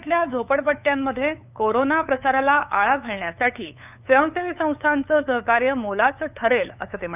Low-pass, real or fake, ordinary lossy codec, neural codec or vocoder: 3.6 kHz; fake; none; codec, 16 kHz, 8 kbps, FunCodec, trained on LibriTTS, 25 frames a second